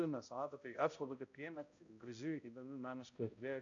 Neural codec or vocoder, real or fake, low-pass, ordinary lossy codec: codec, 16 kHz, 0.5 kbps, X-Codec, HuBERT features, trained on balanced general audio; fake; 7.2 kHz; AAC, 48 kbps